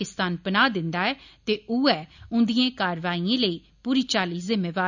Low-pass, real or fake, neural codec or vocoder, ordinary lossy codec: 7.2 kHz; real; none; none